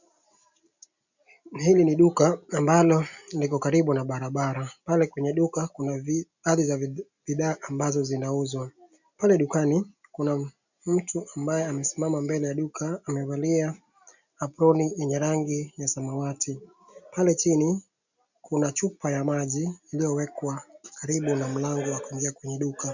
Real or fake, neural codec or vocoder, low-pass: real; none; 7.2 kHz